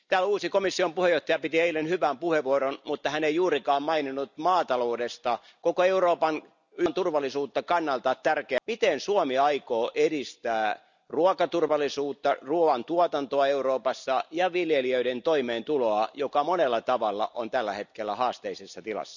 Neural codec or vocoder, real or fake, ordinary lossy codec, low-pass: none; real; none; 7.2 kHz